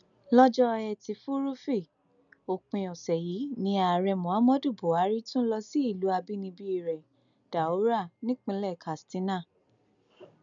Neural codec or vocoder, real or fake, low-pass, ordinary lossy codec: none; real; 7.2 kHz; none